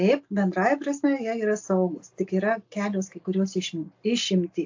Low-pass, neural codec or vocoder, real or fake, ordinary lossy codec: 7.2 kHz; none; real; AAC, 48 kbps